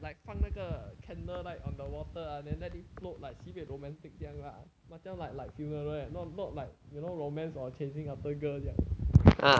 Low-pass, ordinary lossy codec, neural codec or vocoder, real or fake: none; none; none; real